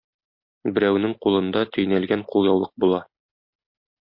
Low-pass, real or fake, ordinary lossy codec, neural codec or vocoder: 5.4 kHz; real; MP3, 32 kbps; none